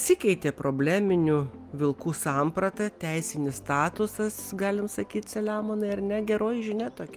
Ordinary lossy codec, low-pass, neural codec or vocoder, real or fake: Opus, 32 kbps; 14.4 kHz; none; real